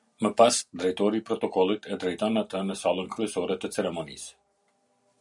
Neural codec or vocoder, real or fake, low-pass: none; real; 10.8 kHz